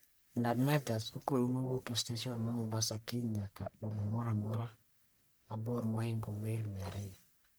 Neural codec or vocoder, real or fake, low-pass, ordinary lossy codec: codec, 44.1 kHz, 1.7 kbps, Pupu-Codec; fake; none; none